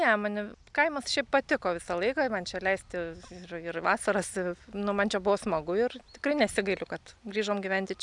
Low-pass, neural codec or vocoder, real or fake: 10.8 kHz; none; real